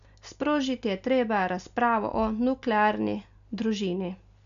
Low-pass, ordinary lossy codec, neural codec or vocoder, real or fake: 7.2 kHz; none; none; real